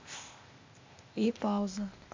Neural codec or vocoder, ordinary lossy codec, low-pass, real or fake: codec, 16 kHz, 0.8 kbps, ZipCodec; MP3, 48 kbps; 7.2 kHz; fake